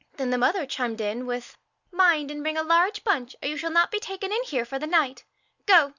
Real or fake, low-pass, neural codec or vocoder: real; 7.2 kHz; none